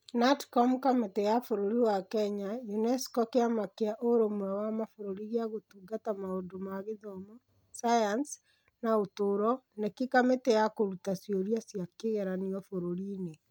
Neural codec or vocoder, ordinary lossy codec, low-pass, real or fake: none; none; none; real